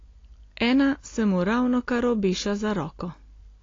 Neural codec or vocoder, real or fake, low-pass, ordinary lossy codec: none; real; 7.2 kHz; AAC, 32 kbps